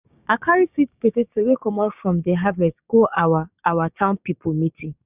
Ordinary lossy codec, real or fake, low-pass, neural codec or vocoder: none; fake; 3.6 kHz; codec, 16 kHz, 6 kbps, DAC